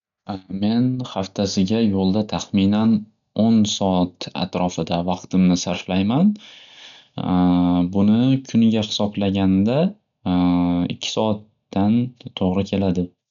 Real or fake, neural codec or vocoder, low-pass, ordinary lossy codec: real; none; 7.2 kHz; none